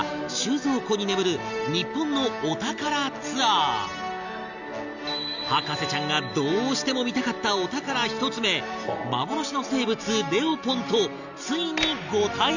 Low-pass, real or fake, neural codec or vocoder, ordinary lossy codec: 7.2 kHz; real; none; none